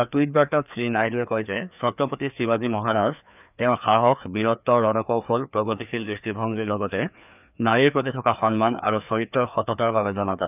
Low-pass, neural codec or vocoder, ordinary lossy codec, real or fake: 3.6 kHz; codec, 16 kHz, 2 kbps, FreqCodec, larger model; none; fake